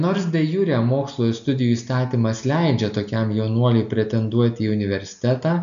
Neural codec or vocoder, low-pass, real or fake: none; 7.2 kHz; real